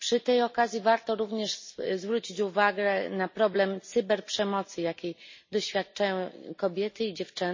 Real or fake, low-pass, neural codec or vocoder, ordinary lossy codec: real; 7.2 kHz; none; MP3, 32 kbps